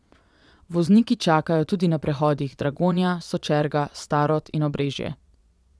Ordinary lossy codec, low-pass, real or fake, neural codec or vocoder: none; none; fake; vocoder, 22.05 kHz, 80 mel bands, Vocos